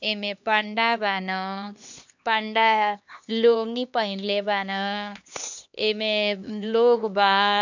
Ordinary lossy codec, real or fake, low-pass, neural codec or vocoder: none; fake; 7.2 kHz; codec, 16 kHz, 2 kbps, X-Codec, HuBERT features, trained on LibriSpeech